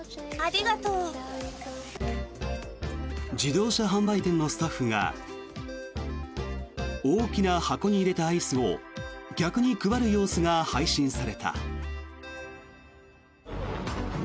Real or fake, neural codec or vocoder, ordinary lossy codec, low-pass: real; none; none; none